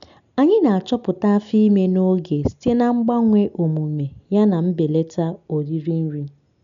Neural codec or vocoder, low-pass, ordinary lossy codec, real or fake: none; 7.2 kHz; none; real